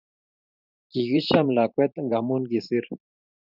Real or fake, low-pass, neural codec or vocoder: fake; 5.4 kHz; vocoder, 44.1 kHz, 128 mel bands every 512 samples, BigVGAN v2